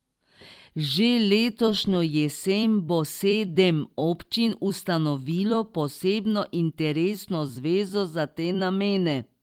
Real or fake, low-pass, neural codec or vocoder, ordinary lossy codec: fake; 19.8 kHz; vocoder, 44.1 kHz, 128 mel bands every 256 samples, BigVGAN v2; Opus, 24 kbps